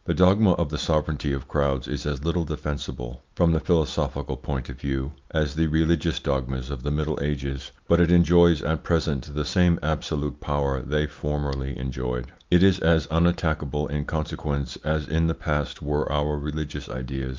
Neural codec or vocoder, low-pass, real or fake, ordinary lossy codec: none; 7.2 kHz; real; Opus, 24 kbps